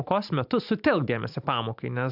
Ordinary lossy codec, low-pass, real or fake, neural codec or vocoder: AAC, 48 kbps; 5.4 kHz; real; none